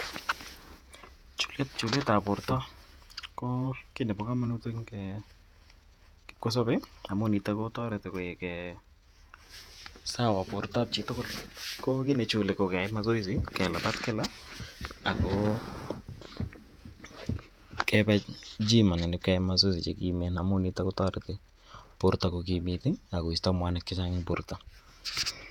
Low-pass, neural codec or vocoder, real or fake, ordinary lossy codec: 19.8 kHz; vocoder, 48 kHz, 128 mel bands, Vocos; fake; none